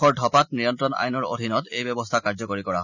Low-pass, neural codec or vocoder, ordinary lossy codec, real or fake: 7.2 kHz; none; none; real